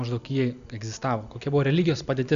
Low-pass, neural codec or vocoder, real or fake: 7.2 kHz; none; real